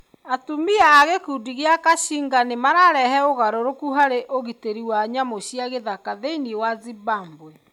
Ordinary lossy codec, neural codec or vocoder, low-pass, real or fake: none; none; 19.8 kHz; real